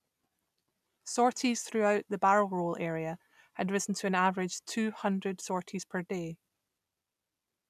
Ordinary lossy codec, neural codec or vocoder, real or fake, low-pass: none; none; real; 14.4 kHz